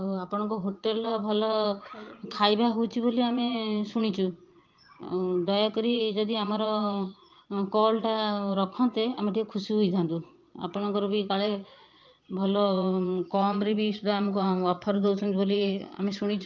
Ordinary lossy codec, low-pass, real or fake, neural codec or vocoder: Opus, 24 kbps; 7.2 kHz; fake; vocoder, 22.05 kHz, 80 mel bands, Vocos